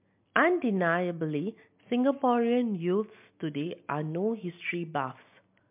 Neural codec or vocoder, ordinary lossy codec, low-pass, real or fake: none; MP3, 32 kbps; 3.6 kHz; real